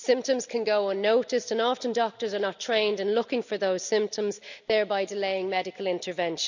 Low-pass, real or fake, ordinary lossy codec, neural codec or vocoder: 7.2 kHz; real; none; none